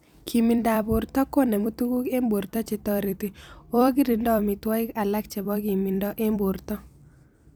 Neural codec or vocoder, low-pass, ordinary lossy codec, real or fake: vocoder, 44.1 kHz, 128 mel bands every 256 samples, BigVGAN v2; none; none; fake